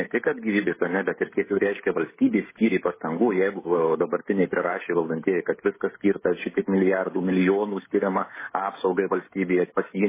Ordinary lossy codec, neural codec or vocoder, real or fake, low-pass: MP3, 16 kbps; codec, 16 kHz, 16 kbps, FreqCodec, smaller model; fake; 3.6 kHz